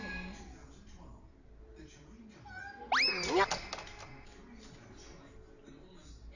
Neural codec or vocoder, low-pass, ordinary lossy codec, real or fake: none; 7.2 kHz; none; real